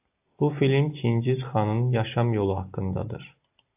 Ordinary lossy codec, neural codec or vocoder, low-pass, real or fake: AAC, 32 kbps; none; 3.6 kHz; real